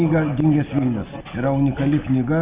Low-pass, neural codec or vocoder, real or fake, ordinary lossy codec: 3.6 kHz; none; real; Opus, 64 kbps